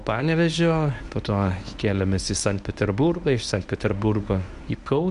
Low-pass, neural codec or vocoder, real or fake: 10.8 kHz; codec, 24 kHz, 0.9 kbps, WavTokenizer, medium speech release version 1; fake